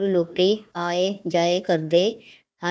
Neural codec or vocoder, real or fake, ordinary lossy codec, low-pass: codec, 16 kHz, 1 kbps, FunCodec, trained on Chinese and English, 50 frames a second; fake; none; none